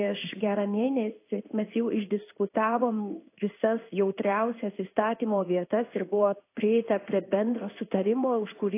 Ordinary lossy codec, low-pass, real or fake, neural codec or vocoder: AAC, 24 kbps; 3.6 kHz; fake; codec, 16 kHz in and 24 kHz out, 1 kbps, XY-Tokenizer